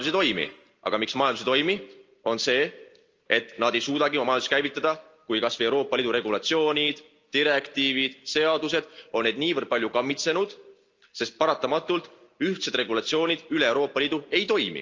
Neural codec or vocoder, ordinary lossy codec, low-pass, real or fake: none; Opus, 24 kbps; 7.2 kHz; real